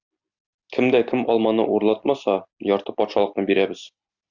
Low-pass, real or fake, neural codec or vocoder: 7.2 kHz; real; none